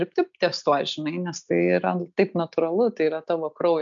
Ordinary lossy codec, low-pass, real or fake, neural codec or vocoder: MP3, 64 kbps; 7.2 kHz; real; none